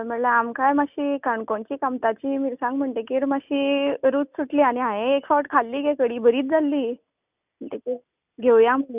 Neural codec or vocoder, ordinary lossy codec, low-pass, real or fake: none; none; 3.6 kHz; real